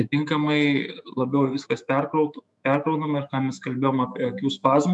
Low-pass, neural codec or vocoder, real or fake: 10.8 kHz; autoencoder, 48 kHz, 128 numbers a frame, DAC-VAE, trained on Japanese speech; fake